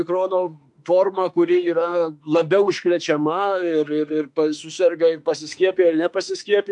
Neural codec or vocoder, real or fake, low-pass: autoencoder, 48 kHz, 32 numbers a frame, DAC-VAE, trained on Japanese speech; fake; 10.8 kHz